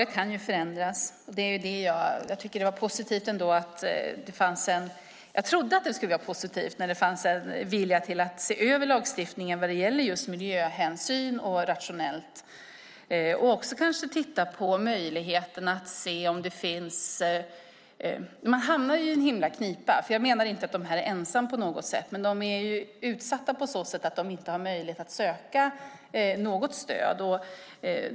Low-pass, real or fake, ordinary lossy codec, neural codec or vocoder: none; real; none; none